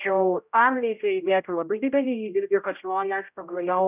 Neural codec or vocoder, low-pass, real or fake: codec, 16 kHz, 0.5 kbps, X-Codec, HuBERT features, trained on general audio; 3.6 kHz; fake